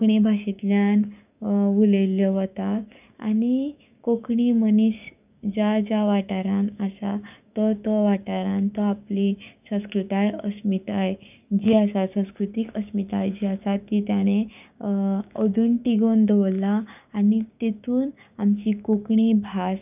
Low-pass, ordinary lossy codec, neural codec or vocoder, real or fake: 3.6 kHz; none; codec, 16 kHz, 6 kbps, DAC; fake